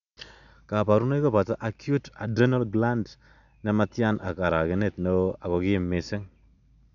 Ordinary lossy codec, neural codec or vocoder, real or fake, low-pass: none; none; real; 7.2 kHz